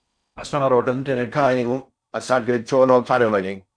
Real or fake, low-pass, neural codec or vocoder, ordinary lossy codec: fake; 9.9 kHz; codec, 16 kHz in and 24 kHz out, 0.6 kbps, FocalCodec, streaming, 4096 codes; MP3, 96 kbps